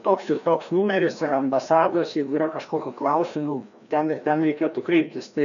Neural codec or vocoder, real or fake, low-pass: codec, 16 kHz, 1 kbps, FreqCodec, larger model; fake; 7.2 kHz